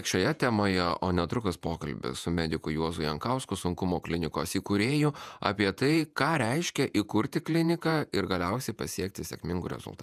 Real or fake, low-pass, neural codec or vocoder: fake; 14.4 kHz; vocoder, 48 kHz, 128 mel bands, Vocos